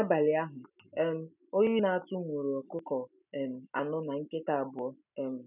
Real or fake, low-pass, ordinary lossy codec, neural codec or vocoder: real; 3.6 kHz; none; none